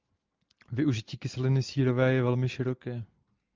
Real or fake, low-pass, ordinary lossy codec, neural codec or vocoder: real; 7.2 kHz; Opus, 32 kbps; none